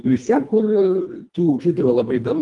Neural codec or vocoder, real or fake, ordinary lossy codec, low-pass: codec, 24 kHz, 1.5 kbps, HILCodec; fake; Opus, 32 kbps; 10.8 kHz